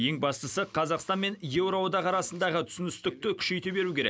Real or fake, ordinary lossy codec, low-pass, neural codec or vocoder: real; none; none; none